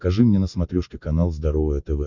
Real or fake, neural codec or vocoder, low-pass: real; none; 7.2 kHz